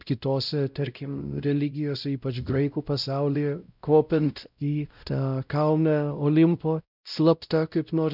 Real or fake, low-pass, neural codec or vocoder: fake; 5.4 kHz; codec, 16 kHz, 0.5 kbps, X-Codec, WavLM features, trained on Multilingual LibriSpeech